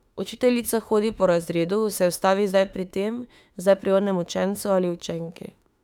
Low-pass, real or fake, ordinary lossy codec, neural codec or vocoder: 19.8 kHz; fake; none; autoencoder, 48 kHz, 32 numbers a frame, DAC-VAE, trained on Japanese speech